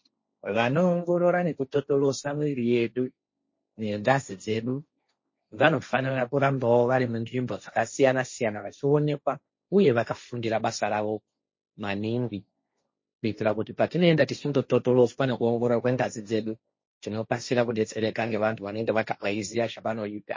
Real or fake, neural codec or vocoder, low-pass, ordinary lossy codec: fake; codec, 16 kHz, 1.1 kbps, Voila-Tokenizer; 7.2 kHz; MP3, 32 kbps